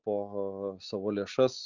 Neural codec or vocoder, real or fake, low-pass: none; real; 7.2 kHz